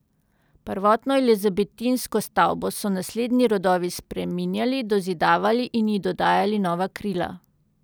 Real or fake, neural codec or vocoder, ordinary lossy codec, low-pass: real; none; none; none